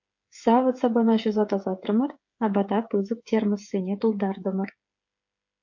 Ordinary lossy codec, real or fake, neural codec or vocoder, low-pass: MP3, 48 kbps; fake; codec, 16 kHz, 8 kbps, FreqCodec, smaller model; 7.2 kHz